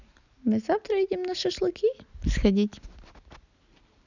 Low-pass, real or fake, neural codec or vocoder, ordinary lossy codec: 7.2 kHz; real; none; none